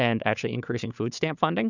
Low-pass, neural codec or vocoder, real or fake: 7.2 kHz; codec, 16 kHz, 4 kbps, FunCodec, trained on Chinese and English, 50 frames a second; fake